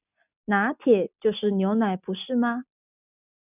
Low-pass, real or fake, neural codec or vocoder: 3.6 kHz; real; none